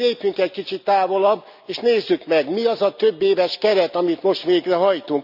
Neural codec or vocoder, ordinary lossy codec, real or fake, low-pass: none; none; real; 5.4 kHz